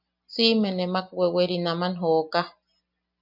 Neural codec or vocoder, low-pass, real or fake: none; 5.4 kHz; real